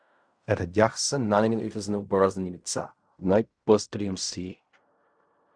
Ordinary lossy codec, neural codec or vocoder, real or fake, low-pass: Opus, 64 kbps; codec, 16 kHz in and 24 kHz out, 0.4 kbps, LongCat-Audio-Codec, fine tuned four codebook decoder; fake; 9.9 kHz